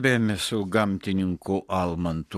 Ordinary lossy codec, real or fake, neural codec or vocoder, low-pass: AAC, 64 kbps; fake; codec, 44.1 kHz, 7.8 kbps, Pupu-Codec; 14.4 kHz